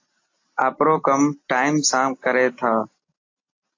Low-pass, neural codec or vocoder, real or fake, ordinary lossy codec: 7.2 kHz; none; real; AAC, 48 kbps